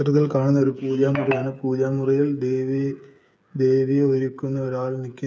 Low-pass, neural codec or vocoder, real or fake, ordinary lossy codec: none; codec, 16 kHz, 8 kbps, FreqCodec, smaller model; fake; none